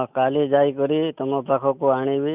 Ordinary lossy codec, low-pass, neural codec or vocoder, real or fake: none; 3.6 kHz; none; real